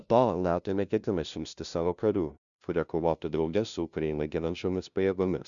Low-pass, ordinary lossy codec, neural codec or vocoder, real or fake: 7.2 kHz; Opus, 64 kbps; codec, 16 kHz, 0.5 kbps, FunCodec, trained on LibriTTS, 25 frames a second; fake